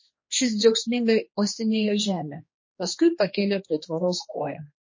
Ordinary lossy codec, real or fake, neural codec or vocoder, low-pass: MP3, 32 kbps; fake; codec, 16 kHz, 2 kbps, X-Codec, HuBERT features, trained on general audio; 7.2 kHz